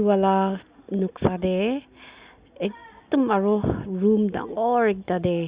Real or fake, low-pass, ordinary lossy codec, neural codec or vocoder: real; 3.6 kHz; Opus, 64 kbps; none